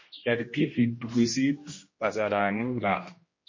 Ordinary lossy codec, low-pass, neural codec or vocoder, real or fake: MP3, 32 kbps; 7.2 kHz; codec, 16 kHz, 1 kbps, X-Codec, HuBERT features, trained on general audio; fake